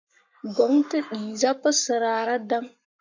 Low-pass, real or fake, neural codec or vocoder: 7.2 kHz; fake; codec, 44.1 kHz, 7.8 kbps, Pupu-Codec